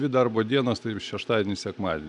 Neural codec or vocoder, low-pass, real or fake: none; 10.8 kHz; real